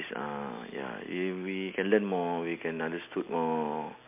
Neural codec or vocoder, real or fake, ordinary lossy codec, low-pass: none; real; MP3, 32 kbps; 3.6 kHz